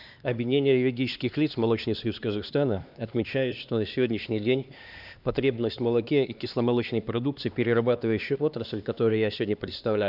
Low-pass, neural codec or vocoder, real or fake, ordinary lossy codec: 5.4 kHz; codec, 16 kHz, 2 kbps, X-Codec, HuBERT features, trained on LibriSpeech; fake; none